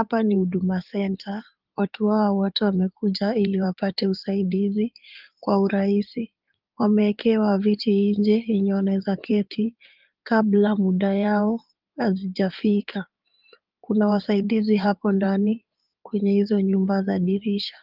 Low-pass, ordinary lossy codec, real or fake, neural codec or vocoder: 5.4 kHz; Opus, 24 kbps; fake; codec, 16 kHz in and 24 kHz out, 2.2 kbps, FireRedTTS-2 codec